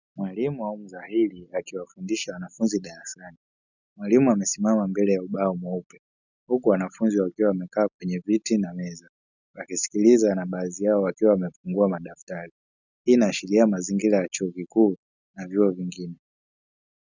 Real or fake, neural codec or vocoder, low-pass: real; none; 7.2 kHz